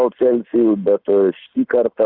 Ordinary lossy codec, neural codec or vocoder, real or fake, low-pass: Opus, 64 kbps; none; real; 5.4 kHz